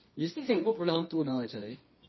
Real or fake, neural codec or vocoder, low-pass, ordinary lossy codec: fake; codec, 24 kHz, 0.9 kbps, WavTokenizer, medium music audio release; 7.2 kHz; MP3, 24 kbps